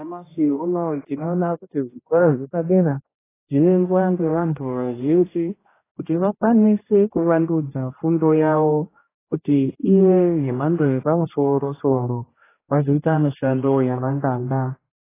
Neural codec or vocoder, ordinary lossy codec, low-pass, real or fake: codec, 16 kHz, 1 kbps, X-Codec, HuBERT features, trained on general audio; AAC, 16 kbps; 3.6 kHz; fake